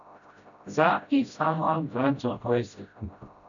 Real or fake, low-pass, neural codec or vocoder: fake; 7.2 kHz; codec, 16 kHz, 0.5 kbps, FreqCodec, smaller model